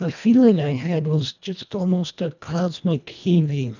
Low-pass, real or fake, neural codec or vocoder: 7.2 kHz; fake; codec, 24 kHz, 1.5 kbps, HILCodec